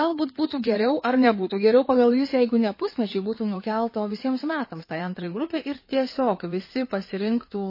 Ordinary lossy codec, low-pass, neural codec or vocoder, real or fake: MP3, 24 kbps; 5.4 kHz; codec, 16 kHz in and 24 kHz out, 2.2 kbps, FireRedTTS-2 codec; fake